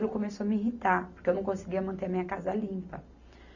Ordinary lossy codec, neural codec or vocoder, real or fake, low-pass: none; none; real; 7.2 kHz